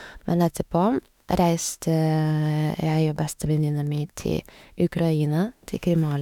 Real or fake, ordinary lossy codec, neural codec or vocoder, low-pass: fake; none; autoencoder, 48 kHz, 32 numbers a frame, DAC-VAE, trained on Japanese speech; 19.8 kHz